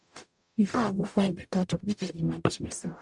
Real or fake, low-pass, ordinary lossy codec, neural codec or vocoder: fake; 10.8 kHz; MP3, 96 kbps; codec, 44.1 kHz, 0.9 kbps, DAC